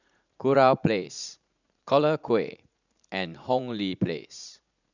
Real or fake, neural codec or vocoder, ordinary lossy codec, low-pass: fake; vocoder, 44.1 kHz, 80 mel bands, Vocos; none; 7.2 kHz